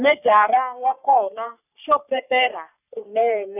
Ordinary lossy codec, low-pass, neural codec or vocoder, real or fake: none; 3.6 kHz; codec, 44.1 kHz, 3.4 kbps, Pupu-Codec; fake